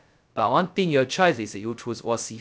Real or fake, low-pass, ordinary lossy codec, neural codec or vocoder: fake; none; none; codec, 16 kHz, 0.2 kbps, FocalCodec